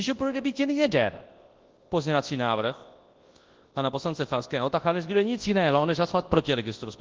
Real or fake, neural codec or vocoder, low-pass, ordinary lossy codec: fake; codec, 24 kHz, 0.9 kbps, WavTokenizer, large speech release; 7.2 kHz; Opus, 16 kbps